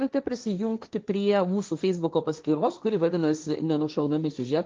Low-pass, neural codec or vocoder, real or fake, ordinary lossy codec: 7.2 kHz; codec, 16 kHz, 1.1 kbps, Voila-Tokenizer; fake; Opus, 24 kbps